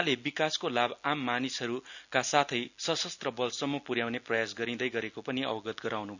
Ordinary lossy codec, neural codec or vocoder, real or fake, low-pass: none; none; real; 7.2 kHz